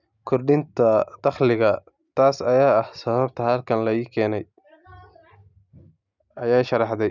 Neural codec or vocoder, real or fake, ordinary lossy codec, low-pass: none; real; none; 7.2 kHz